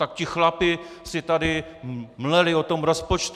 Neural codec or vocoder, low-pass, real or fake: none; 14.4 kHz; real